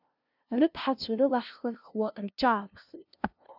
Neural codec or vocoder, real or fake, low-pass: codec, 16 kHz, 0.5 kbps, FunCodec, trained on LibriTTS, 25 frames a second; fake; 5.4 kHz